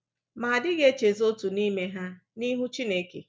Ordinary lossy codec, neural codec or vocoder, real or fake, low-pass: none; none; real; none